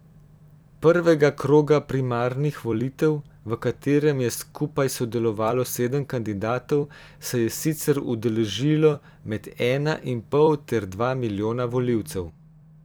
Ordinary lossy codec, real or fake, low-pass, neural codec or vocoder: none; fake; none; vocoder, 44.1 kHz, 128 mel bands every 256 samples, BigVGAN v2